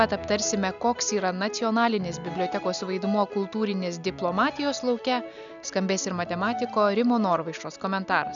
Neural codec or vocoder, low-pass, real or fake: none; 7.2 kHz; real